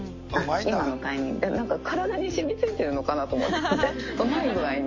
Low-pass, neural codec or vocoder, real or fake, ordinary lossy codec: 7.2 kHz; none; real; MP3, 32 kbps